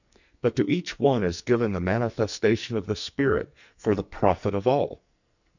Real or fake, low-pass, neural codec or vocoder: fake; 7.2 kHz; codec, 44.1 kHz, 2.6 kbps, SNAC